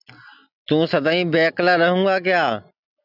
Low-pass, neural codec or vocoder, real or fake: 5.4 kHz; none; real